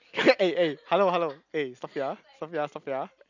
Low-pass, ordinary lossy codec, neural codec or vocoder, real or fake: 7.2 kHz; none; none; real